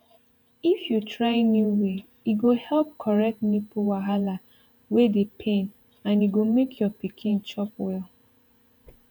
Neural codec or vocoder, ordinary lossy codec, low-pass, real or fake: vocoder, 48 kHz, 128 mel bands, Vocos; none; 19.8 kHz; fake